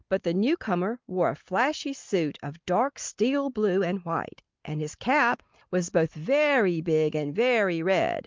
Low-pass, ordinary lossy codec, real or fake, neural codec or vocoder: 7.2 kHz; Opus, 32 kbps; real; none